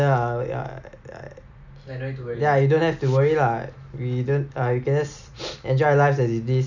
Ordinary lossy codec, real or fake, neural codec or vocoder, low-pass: none; real; none; 7.2 kHz